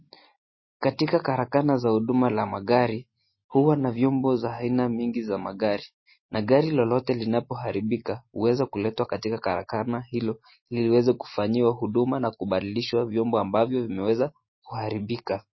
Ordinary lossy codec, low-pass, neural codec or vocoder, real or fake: MP3, 24 kbps; 7.2 kHz; none; real